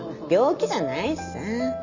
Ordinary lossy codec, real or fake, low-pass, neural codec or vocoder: none; real; 7.2 kHz; none